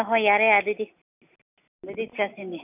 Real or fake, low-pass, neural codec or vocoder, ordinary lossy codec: real; 3.6 kHz; none; none